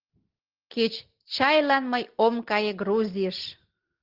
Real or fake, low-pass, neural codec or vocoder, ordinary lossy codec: real; 5.4 kHz; none; Opus, 16 kbps